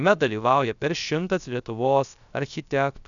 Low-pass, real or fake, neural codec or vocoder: 7.2 kHz; fake; codec, 16 kHz, 0.3 kbps, FocalCodec